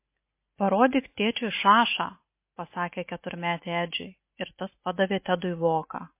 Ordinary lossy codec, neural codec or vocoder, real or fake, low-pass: MP3, 24 kbps; none; real; 3.6 kHz